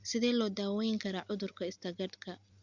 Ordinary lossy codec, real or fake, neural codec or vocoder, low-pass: Opus, 64 kbps; real; none; 7.2 kHz